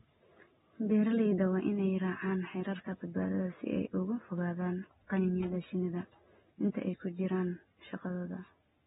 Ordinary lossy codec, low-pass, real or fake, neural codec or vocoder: AAC, 16 kbps; 19.8 kHz; real; none